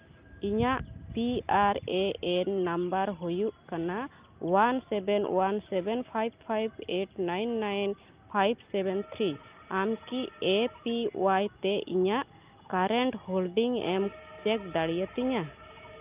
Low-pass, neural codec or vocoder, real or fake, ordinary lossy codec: 3.6 kHz; none; real; Opus, 24 kbps